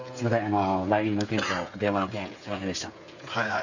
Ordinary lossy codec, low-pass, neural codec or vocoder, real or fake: none; 7.2 kHz; codec, 16 kHz, 16 kbps, FreqCodec, smaller model; fake